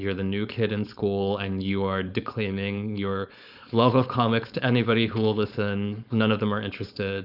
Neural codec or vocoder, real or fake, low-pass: codec, 16 kHz, 4.8 kbps, FACodec; fake; 5.4 kHz